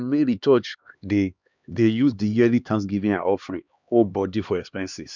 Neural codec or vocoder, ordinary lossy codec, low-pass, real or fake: codec, 16 kHz, 2 kbps, X-Codec, HuBERT features, trained on LibriSpeech; none; 7.2 kHz; fake